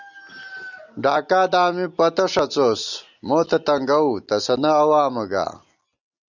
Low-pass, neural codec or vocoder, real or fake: 7.2 kHz; none; real